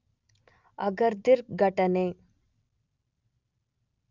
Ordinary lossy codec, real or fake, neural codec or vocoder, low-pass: none; real; none; 7.2 kHz